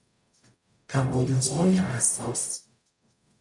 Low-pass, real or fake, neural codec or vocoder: 10.8 kHz; fake; codec, 44.1 kHz, 0.9 kbps, DAC